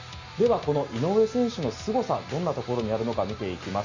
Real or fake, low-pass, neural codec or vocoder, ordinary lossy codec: real; 7.2 kHz; none; Opus, 64 kbps